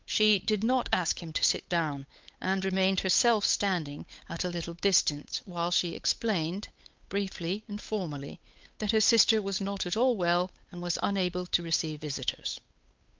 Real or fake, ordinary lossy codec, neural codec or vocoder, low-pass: fake; Opus, 24 kbps; codec, 16 kHz, 8 kbps, FunCodec, trained on Chinese and English, 25 frames a second; 7.2 kHz